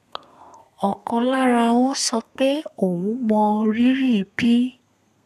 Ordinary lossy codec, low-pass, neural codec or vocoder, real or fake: none; 14.4 kHz; codec, 32 kHz, 1.9 kbps, SNAC; fake